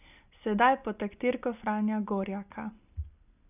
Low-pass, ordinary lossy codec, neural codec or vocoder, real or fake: 3.6 kHz; none; none; real